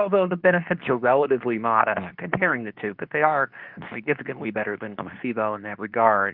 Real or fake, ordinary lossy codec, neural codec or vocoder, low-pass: fake; Opus, 24 kbps; codec, 24 kHz, 0.9 kbps, WavTokenizer, medium speech release version 2; 5.4 kHz